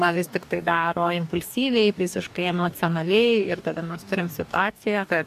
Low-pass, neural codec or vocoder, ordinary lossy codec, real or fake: 14.4 kHz; codec, 44.1 kHz, 2.6 kbps, DAC; AAC, 96 kbps; fake